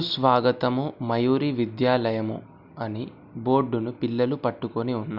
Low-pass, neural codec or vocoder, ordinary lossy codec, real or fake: 5.4 kHz; none; none; real